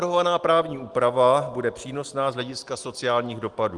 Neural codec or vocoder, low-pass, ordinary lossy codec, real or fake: none; 10.8 kHz; Opus, 24 kbps; real